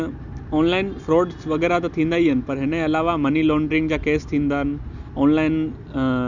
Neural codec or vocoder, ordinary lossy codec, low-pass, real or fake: none; none; 7.2 kHz; real